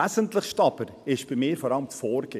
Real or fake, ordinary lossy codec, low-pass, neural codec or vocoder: real; none; 14.4 kHz; none